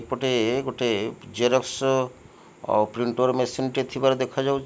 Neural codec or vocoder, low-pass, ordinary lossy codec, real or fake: none; none; none; real